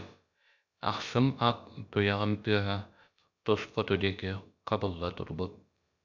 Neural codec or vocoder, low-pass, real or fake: codec, 16 kHz, about 1 kbps, DyCAST, with the encoder's durations; 7.2 kHz; fake